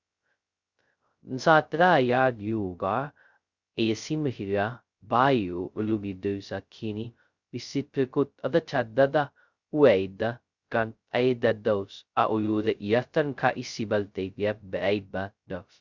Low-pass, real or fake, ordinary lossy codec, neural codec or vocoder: 7.2 kHz; fake; Opus, 64 kbps; codec, 16 kHz, 0.2 kbps, FocalCodec